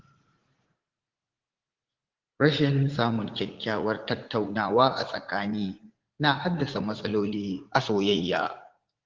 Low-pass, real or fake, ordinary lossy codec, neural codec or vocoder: 7.2 kHz; fake; Opus, 16 kbps; vocoder, 44.1 kHz, 80 mel bands, Vocos